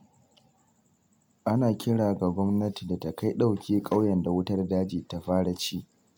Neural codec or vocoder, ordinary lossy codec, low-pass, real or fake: none; none; 19.8 kHz; real